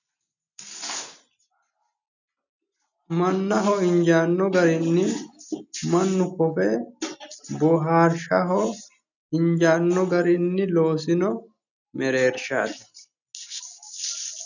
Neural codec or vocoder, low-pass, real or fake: none; 7.2 kHz; real